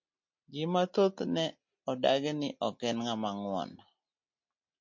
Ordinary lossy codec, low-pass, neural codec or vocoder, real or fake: MP3, 48 kbps; 7.2 kHz; none; real